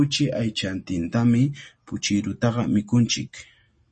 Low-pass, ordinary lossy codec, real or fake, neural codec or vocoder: 10.8 kHz; MP3, 32 kbps; real; none